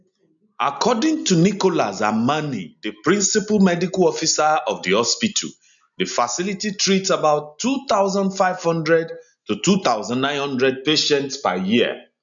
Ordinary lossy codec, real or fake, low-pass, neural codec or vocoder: none; real; 7.2 kHz; none